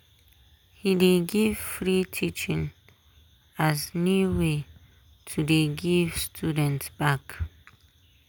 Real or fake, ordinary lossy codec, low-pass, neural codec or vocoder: real; none; none; none